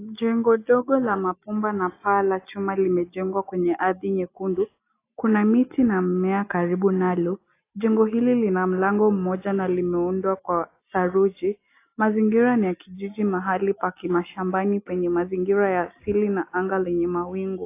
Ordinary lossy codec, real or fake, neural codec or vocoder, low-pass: AAC, 24 kbps; real; none; 3.6 kHz